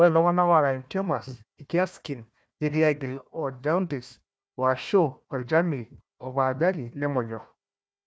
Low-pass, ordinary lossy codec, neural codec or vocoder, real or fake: none; none; codec, 16 kHz, 1 kbps, FunCodec, trained on Chinese and English, 50 frames a second; fake